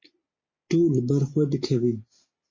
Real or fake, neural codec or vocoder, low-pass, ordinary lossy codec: real; none; 7.2 kHz; MP3, 32 kbps